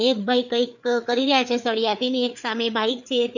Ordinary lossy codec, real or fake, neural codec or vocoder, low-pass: none; fake; codec, 16 kHz, 4 kbps, FreqCodec, larger model; 7.2 kHz